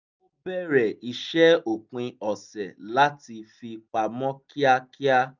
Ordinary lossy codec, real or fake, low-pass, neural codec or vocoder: none; real; 7.2 kHz; none